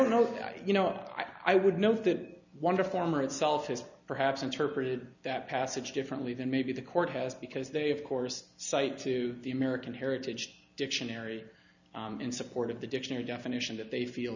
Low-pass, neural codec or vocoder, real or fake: 7.2 kHz; none; real